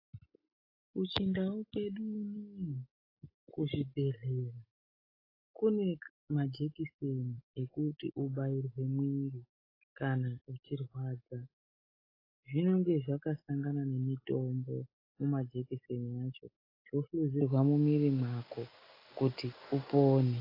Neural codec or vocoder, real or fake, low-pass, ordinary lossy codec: none; real; 5.4 kHz; AAC, 24 kbps